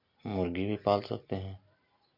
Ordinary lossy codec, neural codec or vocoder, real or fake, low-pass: MP3, 32 kbps; none; real; 5.4 kHz